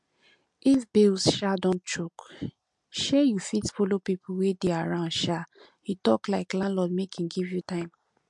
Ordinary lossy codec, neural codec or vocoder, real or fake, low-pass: MP3, 64 kbps; none; real; 10.8 kHz